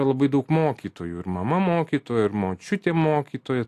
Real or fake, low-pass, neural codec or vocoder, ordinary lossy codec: real; 14.4 kHz; none; AAC, 64 kbps